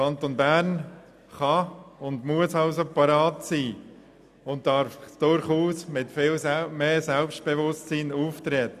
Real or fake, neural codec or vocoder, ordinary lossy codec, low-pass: real; none; none; 14.4 kHz